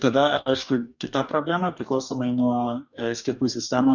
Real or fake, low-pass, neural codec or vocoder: fake; 7.2 kHz; codec, 44.1 kHz, 2.6 kbps, DAC